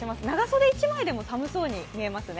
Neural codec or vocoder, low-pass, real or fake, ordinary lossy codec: none; none; real; none